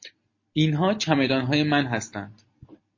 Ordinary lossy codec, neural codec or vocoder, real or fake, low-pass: MP3, 32 kbps; none; real; 7.2 kHz